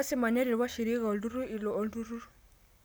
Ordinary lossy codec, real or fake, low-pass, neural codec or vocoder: none; real; none; none